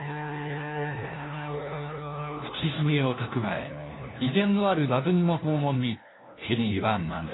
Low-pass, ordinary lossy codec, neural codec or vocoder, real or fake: 7.2 kHz; AAC, 16 kbps; codec, 16 kHz, 1 kbps, FunCodec, trained on LibriTTS, 50 frames a second; fake